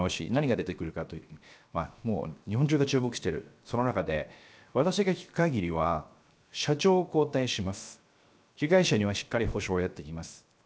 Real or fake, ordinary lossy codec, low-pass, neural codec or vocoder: fake; none; none; codec, 16 kHz, 0.7 kbps, FocalCodec